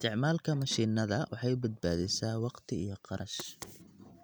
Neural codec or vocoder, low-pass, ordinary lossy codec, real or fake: none; none; none; real